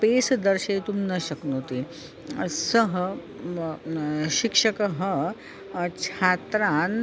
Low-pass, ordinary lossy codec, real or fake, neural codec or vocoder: none; none; real; none